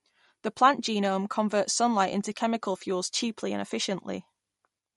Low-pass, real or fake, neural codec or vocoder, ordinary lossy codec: 19.8 kHz; real; none; MP3, 48 kbps